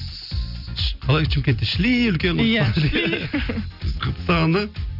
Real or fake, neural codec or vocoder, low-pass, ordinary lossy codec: real; none; 5.4 kHz; none